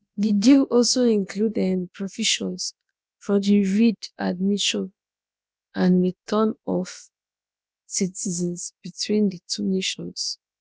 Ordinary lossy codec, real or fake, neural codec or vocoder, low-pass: none; fake; codec, 16 kHz, about 1 kbps, DyCAST, with the encoder's durations; none